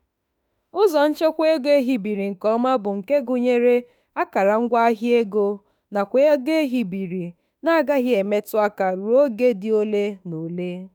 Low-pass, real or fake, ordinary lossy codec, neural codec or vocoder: none; fake; none; autoencoder, 48 kHz, 32 numbers a frame, DAC-VAE, trained on Japanese speech